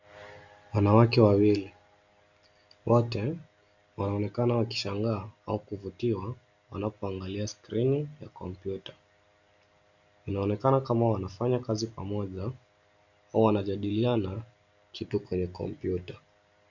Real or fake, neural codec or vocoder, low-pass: real; none; 7.2 kHz